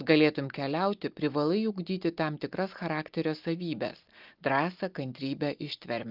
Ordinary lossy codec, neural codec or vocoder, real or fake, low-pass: Opus, 32 kbps; none; real; 5.4 kHz